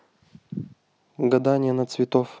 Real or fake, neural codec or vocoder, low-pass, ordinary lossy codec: real; none; none; none